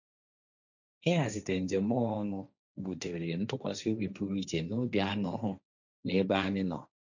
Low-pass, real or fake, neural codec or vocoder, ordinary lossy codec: 7.2 kHz; fake; codec, 16 kHz, 1.1 kbps, Voila-Tokenizer; none